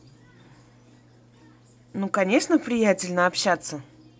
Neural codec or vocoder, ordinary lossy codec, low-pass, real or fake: none; none; none; real